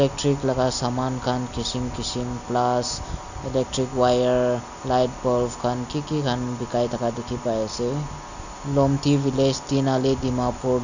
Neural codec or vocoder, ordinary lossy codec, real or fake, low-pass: none; none; real; 7.2 kHz